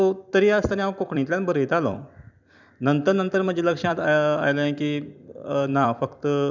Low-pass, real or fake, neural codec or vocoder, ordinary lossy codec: 7.2 kHz; fake; vocoder, 44.1 kHz, 128 mel bands every 256 samples, BigVGAN v2; none